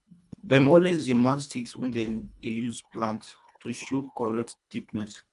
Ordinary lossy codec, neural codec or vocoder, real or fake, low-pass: AAC, 96 kbps; codec, 24 kHz, 1.5 kbps, HILCodec; fake; 10.8 kHz